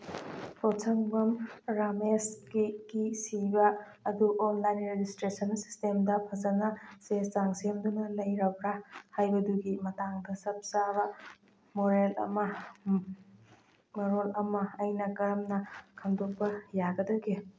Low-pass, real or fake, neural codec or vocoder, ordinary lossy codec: none; real; none; none